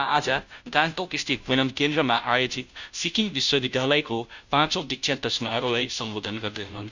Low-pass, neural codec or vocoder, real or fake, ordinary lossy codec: 7.2 kHz; codec, 16 kHz, 0.5 kbps, FunCodec, trained on Chinese and English, 25 frames a second; fake; none